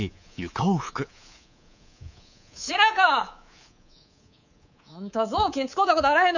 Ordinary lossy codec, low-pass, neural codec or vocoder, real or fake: none; 7.2 kHz; codec, 24 kHz, 3.1 kbps, DualCodec; fake